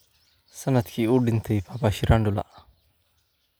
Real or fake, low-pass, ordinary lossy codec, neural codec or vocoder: real; none; none; none